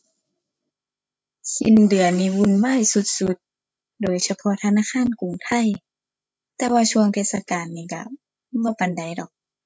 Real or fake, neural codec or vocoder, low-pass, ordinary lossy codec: fake; codec, 16 kHz, 16 kbps, FreqCodec, larger model; none; none